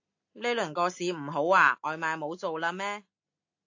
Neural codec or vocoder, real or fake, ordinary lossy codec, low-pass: none; real; AAC, 48 kbps; 7.2 kHz